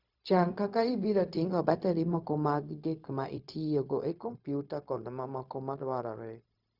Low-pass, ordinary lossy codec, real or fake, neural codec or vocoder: 5.4 kHz; Opus, 64 kbps; fake; codec, 16 kHz, 0.4 kbps, LongCat-Audio-Codec